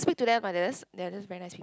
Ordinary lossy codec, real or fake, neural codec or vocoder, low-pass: none; real; none; none